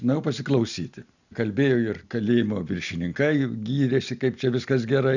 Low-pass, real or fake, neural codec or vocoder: 7.2 kHz; real; none